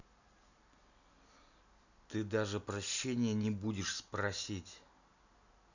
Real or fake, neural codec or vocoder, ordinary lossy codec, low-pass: real; none; none; 7.2 kHz